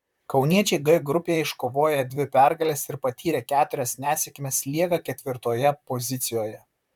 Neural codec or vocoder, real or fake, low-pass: vocoder, 44.1 kHz, 128 mel bands, Pupu-Vocoder; fake; 19.8 kHz